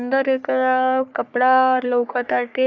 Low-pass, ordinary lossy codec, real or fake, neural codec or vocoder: 7.2 kHz; none; fake; codec, 44.1 kHz, 3.4 kbps, Pupu-Codec